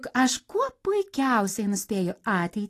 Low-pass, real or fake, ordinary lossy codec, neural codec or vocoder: 14.4 kHz; real; AAC, 48 kbps; none